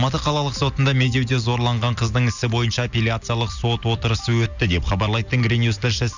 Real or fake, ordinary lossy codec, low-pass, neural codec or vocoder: real; none; 7.2 kHz; none